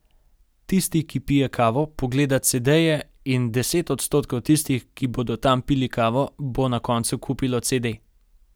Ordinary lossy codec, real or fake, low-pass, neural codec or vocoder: none; real; none; none